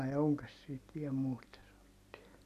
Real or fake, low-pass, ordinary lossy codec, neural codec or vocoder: real; 14.4 kHz; none; none